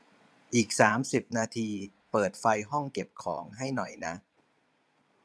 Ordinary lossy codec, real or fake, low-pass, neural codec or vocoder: none; fake; none; vocoder, 22.05 kHz, 80 mel bands, Vocos